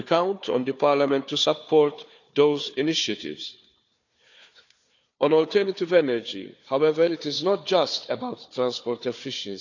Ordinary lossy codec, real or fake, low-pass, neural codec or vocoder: none; fake; 7.2 kHz; codec, 16 kHz, 4 kbps, FunCodec, trained on Chinese and English, 50 frames a second